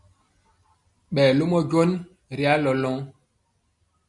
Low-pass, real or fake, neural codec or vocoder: 10.8 kHz; real; none